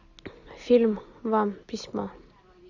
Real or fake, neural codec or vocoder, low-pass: real; none; 7.2 kHz